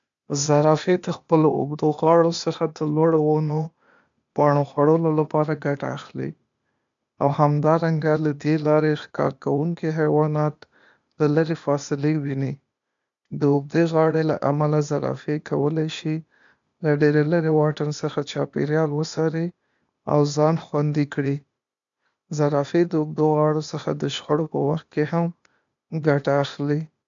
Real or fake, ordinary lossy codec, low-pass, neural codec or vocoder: fake; MP3, 64 kbps; 7.2 kHz; codec, 16 kHz, 0.8 kbps, ZipCodec